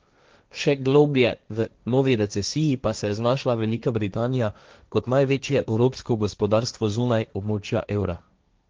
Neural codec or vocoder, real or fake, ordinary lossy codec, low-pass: codec, 16 kHz, 1.1 kbps, Voila-Tokenizer; fake; Opus, 24 kbps; 7.2 kHz